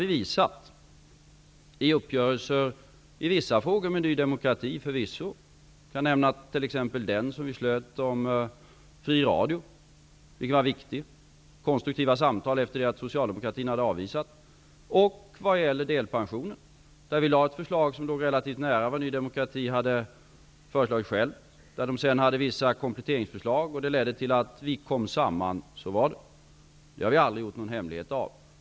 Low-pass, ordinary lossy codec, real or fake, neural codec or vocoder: none; none; real; none